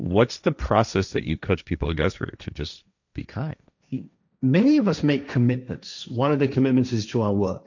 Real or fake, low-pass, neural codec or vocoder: fake; 7.2 kHz; codec, 16 kHz, 1.1 kbps, Voila-Tokenizer